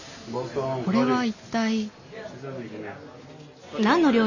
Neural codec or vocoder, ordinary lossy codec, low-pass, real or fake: none; none; 7.2 kHz; real